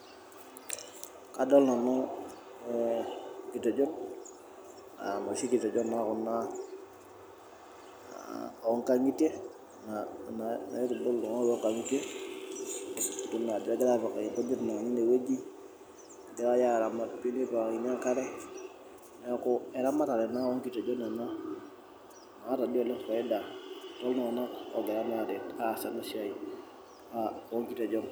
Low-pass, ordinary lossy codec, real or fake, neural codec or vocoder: none; none; real; none